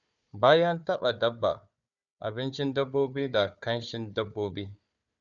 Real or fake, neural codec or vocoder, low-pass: fake; codec, 16 kHz, 4 kbps, FunCodec, trained on Chinese and English, 50 frames a second; 7.2 kHz